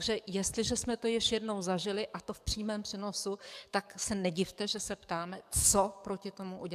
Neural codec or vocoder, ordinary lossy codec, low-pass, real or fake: codec, 44.1 kHz, 7.8 kbps, DAC; AAC, 96 kbps; 14.4 kHz; fake